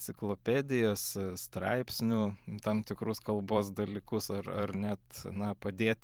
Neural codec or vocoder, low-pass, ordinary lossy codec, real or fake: vocoder, 44.1 kHz, 128 mel bands every 512 samples, BigVGAN v2; 19.8 kHz; Opus, 24 kbps; fake